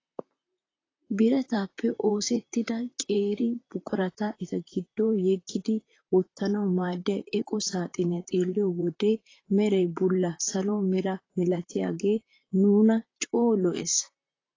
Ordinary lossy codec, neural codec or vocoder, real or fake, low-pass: AAC, 32 kbps; vocoder, 44.1 kHz, 128 mel bands, Pupu-Vocoder; fake; 7.2 kHz